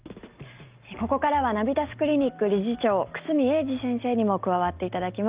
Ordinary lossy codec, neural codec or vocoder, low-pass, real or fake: Opus, 32 kbps; none; 3.6 kHz; real